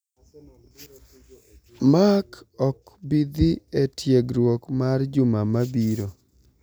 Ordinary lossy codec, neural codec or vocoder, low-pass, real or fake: none; none; none; real